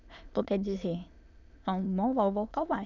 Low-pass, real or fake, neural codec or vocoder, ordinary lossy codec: 7.2 kHz; fake; autoencoder, 22.05 kHz, a latent of 192 numbers a frame, VITS, trained on many speakers; none